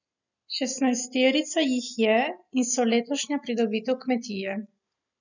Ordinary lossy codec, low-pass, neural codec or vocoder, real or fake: none; 7.2 kHz; none; real